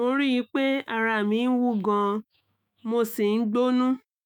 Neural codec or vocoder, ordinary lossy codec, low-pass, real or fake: autoencoder, 48 kHz, 128 numbers a frame, DAC-VAE, trained on Japanese speech; none; 19.8 kHz; fake